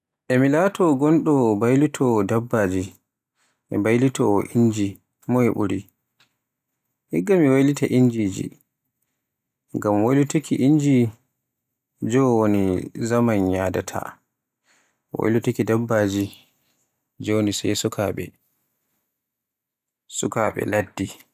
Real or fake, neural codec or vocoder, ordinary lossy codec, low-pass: real; none; none; 14.4 kHz